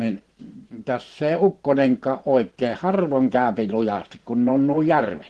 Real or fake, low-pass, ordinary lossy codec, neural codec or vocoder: fake; 10.8 kHz; Opus, 16 kbps; vocoder, 24 kHz, 100 mel bands, Vocos